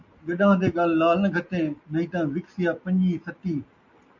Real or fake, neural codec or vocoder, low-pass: real; none; 7.2 kHz